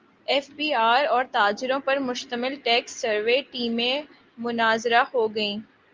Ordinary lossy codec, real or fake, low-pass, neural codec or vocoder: Opus, 24 kbps; real; 7.2 kHz; none